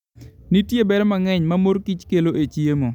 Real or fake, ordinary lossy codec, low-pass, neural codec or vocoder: real; none; 19.8 kHz; none